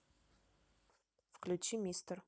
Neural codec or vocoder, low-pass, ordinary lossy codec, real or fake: none; none; none; real